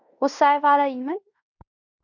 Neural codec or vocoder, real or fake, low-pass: codec, 16 kHz in and 24 kHz out, 0.9 kbps, LongCat-Audio-Codec, fine tuned four codebook decoder; fake; 7.2 kHz